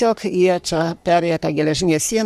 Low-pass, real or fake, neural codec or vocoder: 14.4 kHz; fake; codec, 44.1 kHz, 3.4 kbps, Pupu-Codec